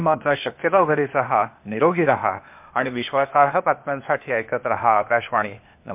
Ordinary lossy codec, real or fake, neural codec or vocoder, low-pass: MP3, 32 kbps; fake; codec, 16 kHz, 0.8 kbps, ZipCodec; 3.6 kHz